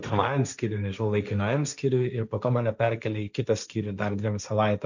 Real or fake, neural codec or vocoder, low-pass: fake; codec, 16 kHz, 1.1 kbps, Voila-Tokenizer; 7.2 kHz